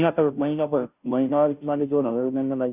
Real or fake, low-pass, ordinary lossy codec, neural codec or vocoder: fake; 3.6 kHz; none; codec, 16 kHz, 0.5 kbps, FunCodec, trained on Chinese and English, 25 frames a second